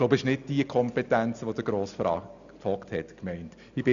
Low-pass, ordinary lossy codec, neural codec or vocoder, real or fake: 7.2 kHz; none; none; real